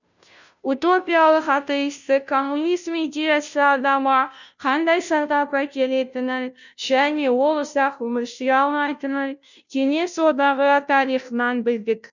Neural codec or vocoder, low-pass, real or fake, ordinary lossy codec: codec, 16 kHz, 0.5 kbps, FunCodec, trained on Chinese and English, 25 frames a second; 7.2 kHz; fake; none